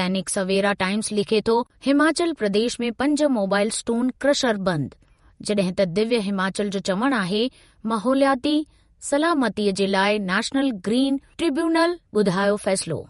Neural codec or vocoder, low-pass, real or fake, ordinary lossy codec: vocoder, 48 kHz, 128 mel bands, Vocos; 19.8 kHz; fake; MP3, 48 kbps